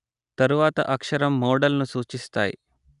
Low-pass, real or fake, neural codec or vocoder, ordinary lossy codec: 10.8 kHz; real; none; none